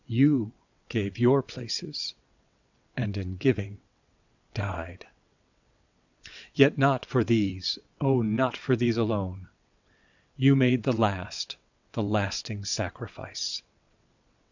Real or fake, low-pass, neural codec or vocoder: fake; 7.2 kHz; vocoder, 22.05 kHz, 80 mel bands, WaveNeXt